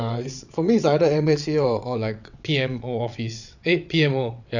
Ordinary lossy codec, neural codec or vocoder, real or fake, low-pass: none; vocoder, 22.05 kHz, 80 mel bands, WaveNeXt; fake; 7.2 kHz